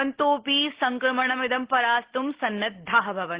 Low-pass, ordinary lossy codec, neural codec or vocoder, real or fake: 3.6 kHz; Opus, 16 kbps; none; real